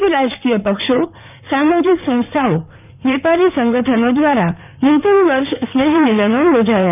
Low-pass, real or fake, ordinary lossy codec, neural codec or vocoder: 3.6 kHz; fake; none; codec, 16 kHz in and 24 kHz out, 2.2 kbps, FireRedTTS-2 codec